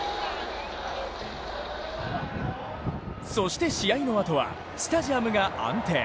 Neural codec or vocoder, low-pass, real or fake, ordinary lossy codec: none; none; real; none